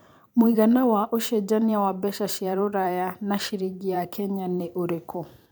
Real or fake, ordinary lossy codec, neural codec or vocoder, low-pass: fake; none; vocoder, 44.1 kHz, 128 mel bands every 512 samples, BigVGAN v2; none